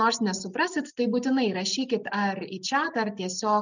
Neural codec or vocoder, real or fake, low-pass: none; real; 7.2 kHz